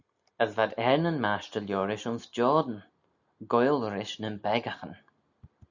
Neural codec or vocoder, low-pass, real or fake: none; 7.2 kHz; real